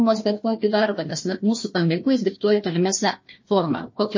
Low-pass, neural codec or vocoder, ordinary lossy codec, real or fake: 7.2 kHz; codec, 16 kHz, 1 kbps, FunCodec, trained on Chinese and English, 50 frames a second; MP3, 32 kbps; fake